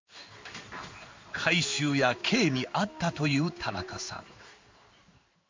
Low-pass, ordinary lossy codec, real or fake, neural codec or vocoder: 7.2 kHz; MP3, 48 kbps; fake; codec, 16 kHz in and 24 kHz out, 1 kbps, XY-Tokenizer